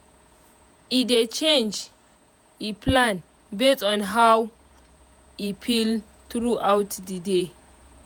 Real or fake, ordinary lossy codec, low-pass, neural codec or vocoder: fake; none; none; vocoder, 48 kHz, 128 mel bands, Vocos